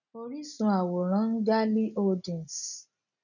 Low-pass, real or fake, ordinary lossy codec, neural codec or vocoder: 7.2 kHz; real; none; none